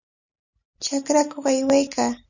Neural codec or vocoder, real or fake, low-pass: none; real; 7.2 kHz